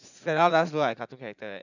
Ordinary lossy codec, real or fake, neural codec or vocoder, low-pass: none; fake; vocoder, 44.1 kHz, 80 mel bands, Vocos; 7.2 kHz